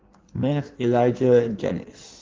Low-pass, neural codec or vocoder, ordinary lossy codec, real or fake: 7.2 kHz; codec, 16 kHz in and 24 kHz out, 1.1 kbps, FireRedTTS-2 codec; Opus, 16 kbps; fake